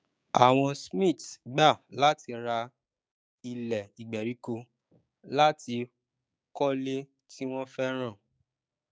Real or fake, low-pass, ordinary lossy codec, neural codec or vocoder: fake; none; none; codec, 16 kHz, 6 kbps, DAC